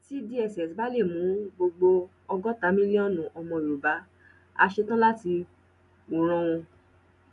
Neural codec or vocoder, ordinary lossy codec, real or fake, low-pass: none; none; real; 10.8 kHz